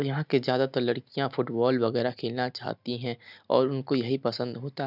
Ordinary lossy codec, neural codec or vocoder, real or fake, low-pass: none; none; real; 5.4 kHz